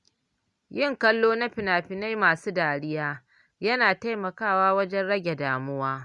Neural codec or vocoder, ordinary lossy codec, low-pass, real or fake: none; Opus, 64 kbps; 10.8 kHz; real